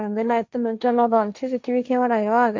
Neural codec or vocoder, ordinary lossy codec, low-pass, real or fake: codec, 16 kHz, 1.1 kbps, Voila-Tokenizer; MP3, 64 kbps; 7.2 kHz; fake